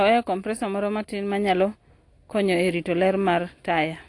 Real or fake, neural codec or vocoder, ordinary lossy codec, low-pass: real; none; AAC, 48 kbps; 10.8 kHz